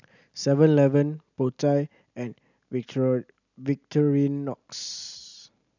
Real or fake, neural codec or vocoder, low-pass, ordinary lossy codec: real; none; 7.2 kHz; none